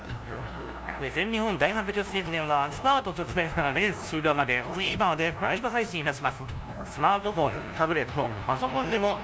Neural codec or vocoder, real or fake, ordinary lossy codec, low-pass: codec, 16 kHz, 0.5 kbps, FunCodec, trained on LibriTTS, 25 frames a second; fake; none; none